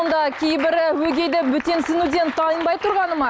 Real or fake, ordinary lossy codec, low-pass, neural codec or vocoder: real; none; none; none